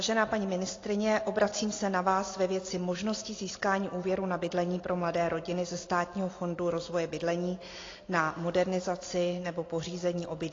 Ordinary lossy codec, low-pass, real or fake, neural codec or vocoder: AAC, 32 kbps; 7.2 kHz; real; none